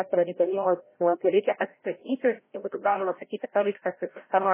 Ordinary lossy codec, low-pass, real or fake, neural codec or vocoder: MP3, 16 kbps; 3.6 kHz; fake; codec, 16 kHz, 0.5 kbps, FreqCodec, larger model